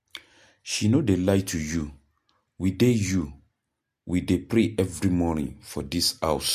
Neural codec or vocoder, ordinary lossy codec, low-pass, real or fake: none; MP3, 64 kbps; 14.4 kHz; real